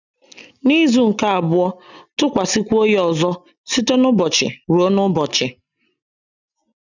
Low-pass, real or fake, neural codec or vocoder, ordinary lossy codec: 7.2 kHz; real; none; none